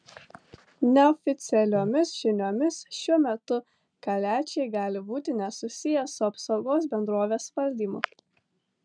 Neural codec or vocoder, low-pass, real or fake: none; 9.9 kHz; real